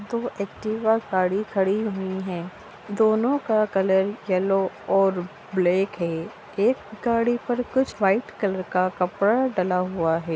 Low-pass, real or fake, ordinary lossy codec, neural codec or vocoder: none; real; none; none